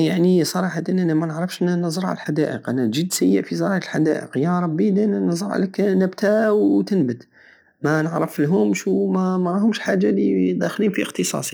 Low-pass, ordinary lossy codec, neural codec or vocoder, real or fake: none; none; none; real